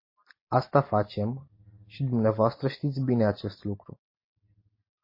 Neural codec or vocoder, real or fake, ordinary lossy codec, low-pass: none; real; MP3, 24 kbps; 5.4 kHz